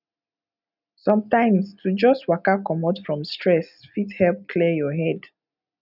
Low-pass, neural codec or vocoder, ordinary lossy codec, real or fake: 5.4 kHz; none; none; real